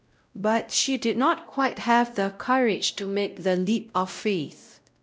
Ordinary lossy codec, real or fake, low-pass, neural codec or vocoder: none; fake; none; codec, 16 kHz, 0.5 kbps, X-Codec, WavLM features, trained on Multilingual LibriSpeech